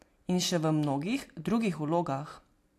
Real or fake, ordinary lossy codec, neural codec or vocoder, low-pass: real; AAC, 64 kbps; none; 14.4 kHz